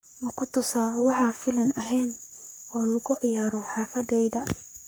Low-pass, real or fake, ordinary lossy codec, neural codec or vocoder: none; fake; none; codec, 44.1 kHz, 3.4 kbps, Pupu-Codec